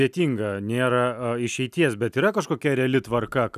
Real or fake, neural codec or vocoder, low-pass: real; none; 14.4 kHz